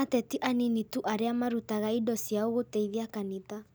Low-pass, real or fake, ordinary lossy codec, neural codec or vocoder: none; real; none; none